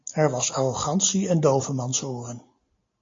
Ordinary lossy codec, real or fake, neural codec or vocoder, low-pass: AAC, 32 kbps; real; none; 7.2 kHz